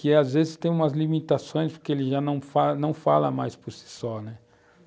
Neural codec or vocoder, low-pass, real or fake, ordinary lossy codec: none; none; real; none